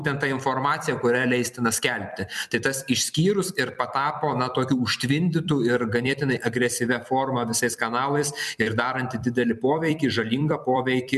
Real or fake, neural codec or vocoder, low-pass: fake; vocoder, 44.1 kHz, 128 mel bands every 256 samples, BigVGAN v2; 14.4 kHz